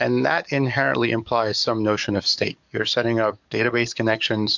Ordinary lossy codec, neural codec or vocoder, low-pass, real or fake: MP3, 64 kbps; codec, 44.1 kHz, 7.8 kbps, DAC; 7.2 kHz; fake